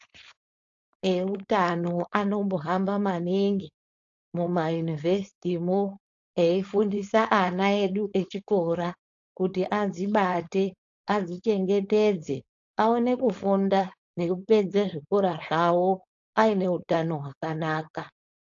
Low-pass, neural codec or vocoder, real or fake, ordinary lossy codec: 7.2 kHz; codec, 16 kHz, 4.8 kbps, FACodec; fake; AAC, 48 kbps